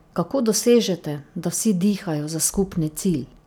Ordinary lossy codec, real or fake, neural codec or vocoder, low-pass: none; real; none; none